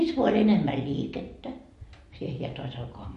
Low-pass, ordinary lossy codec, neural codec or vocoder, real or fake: 14.4 kHz; MP3, 48 kbps; none; real